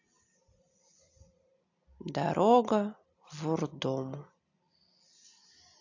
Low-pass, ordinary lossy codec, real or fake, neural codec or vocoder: 7.2 kHz; AAC, 48 kbps; real; none